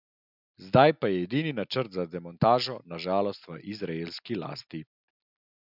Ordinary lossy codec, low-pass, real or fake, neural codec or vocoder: none; 5.4 kHz; real; none